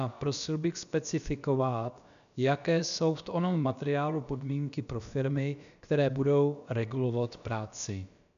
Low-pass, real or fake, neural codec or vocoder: 7.2 kHz; fake; codec, 16 kHz, about 1 kbps, DyCAST, with the encoder's durations